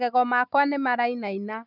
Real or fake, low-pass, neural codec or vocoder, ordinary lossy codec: real; 5.4 kHz; none; none